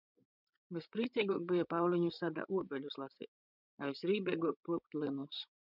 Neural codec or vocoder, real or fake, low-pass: codec, 16 kHz, 16 kbps, FreqCodec, larger model; fake; 5.4 kHz